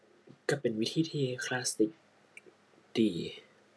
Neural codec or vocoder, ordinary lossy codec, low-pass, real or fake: none; none; none; real